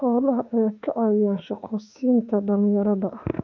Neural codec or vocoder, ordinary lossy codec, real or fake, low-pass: autoencoder, 48 kHz, 32 numbers a frame, DAC-VAE, trained on Japanese speech; none; fake; 7.2 kHz